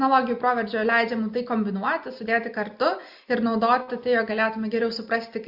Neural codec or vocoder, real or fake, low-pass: none; real; 5.4 kHz